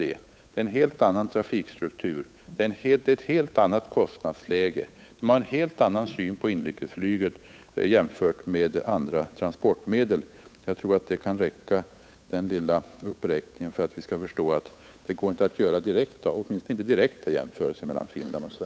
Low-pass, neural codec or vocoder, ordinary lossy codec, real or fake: none; codec, 16 kHz, 8 kbps, FunCodec, trained on Chinese and English, 25 frames a second; none; fake